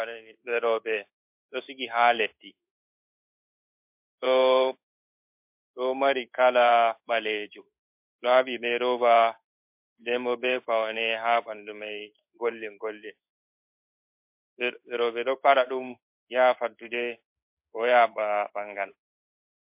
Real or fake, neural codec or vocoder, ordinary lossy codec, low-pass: fake; codec, 16 kHz in and 24 kHz out, 1 kbps, XY-Tokenizer; MP3, 32 kbps; 3.6 kHz